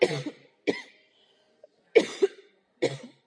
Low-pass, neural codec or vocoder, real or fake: 9.9 kHz; none; real